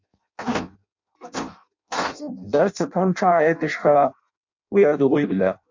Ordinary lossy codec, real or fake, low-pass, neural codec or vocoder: MP3, 64 kbps; fake; 7.2 kHz; codec, 16 kHz in and 24 kHz out, 0.6 kbps, FireRedTTS-2 codec